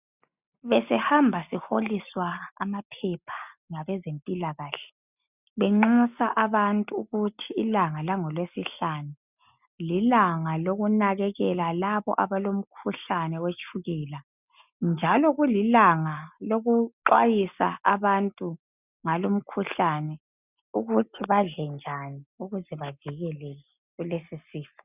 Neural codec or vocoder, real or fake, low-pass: none; real; 3.6 kHz